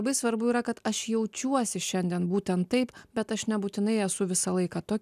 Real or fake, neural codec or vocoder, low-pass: real; none; 14.4 kHz